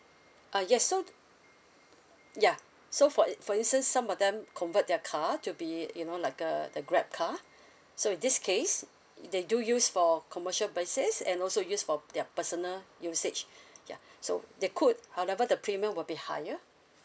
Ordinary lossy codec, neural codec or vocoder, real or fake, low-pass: none; none; real; none